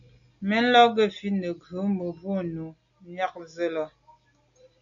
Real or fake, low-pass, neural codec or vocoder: real; 7.2 kHz; none